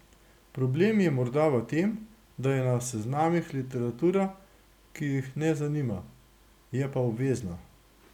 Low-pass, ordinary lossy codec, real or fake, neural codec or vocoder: 19.8 kHz; none; real; none